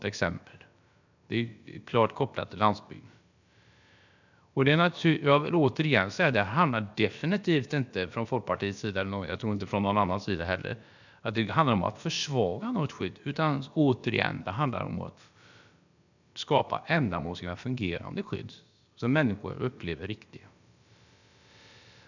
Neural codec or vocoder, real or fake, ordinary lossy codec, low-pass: codec, 16 kHz, about 1 kbps, DyCAST, with the encoder's durations; fake; none; 7.2 kHz